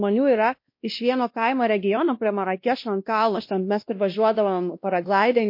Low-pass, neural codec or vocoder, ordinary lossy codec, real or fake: 5.4 kHz; codec, 16 kHz, 1 kbps, X-Codec, WavLM features, trained on Multilingual LibriSpeech; MP3, 32 kbps; fake